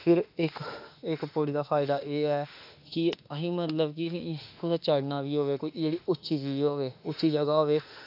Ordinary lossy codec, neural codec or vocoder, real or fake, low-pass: AAC, 48 kbps; autoencoder, 48 kHz, 32 numbers a frame, DAC-VAE, trained on Japanese speech; fake; 5.4 kHz